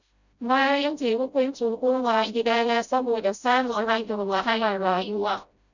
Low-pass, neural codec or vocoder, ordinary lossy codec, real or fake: 7.2 kHz; codec, 16 kHz, 0.5 kbps, FreqCodec, smaller model; Opus, 64 kbps; fake